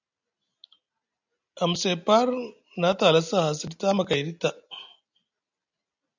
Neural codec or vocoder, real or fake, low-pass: none; real; 7.2 kHz